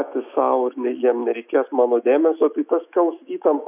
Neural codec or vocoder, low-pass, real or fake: autoencoder, 48 kHz, 128 numbers a frame, DAC-VAE, trained on Japanese speech; 3.6 kHz; fake